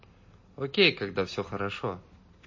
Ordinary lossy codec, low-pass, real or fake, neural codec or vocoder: MP3, 32 kbps; 7.2 kHz; real; none